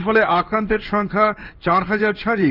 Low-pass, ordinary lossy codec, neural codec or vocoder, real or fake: 5.4 kHz; Opus, 24 kbps; codec, 16 kHz in and 24 kHz out, 1 kbps, XY-Tokenizer; fake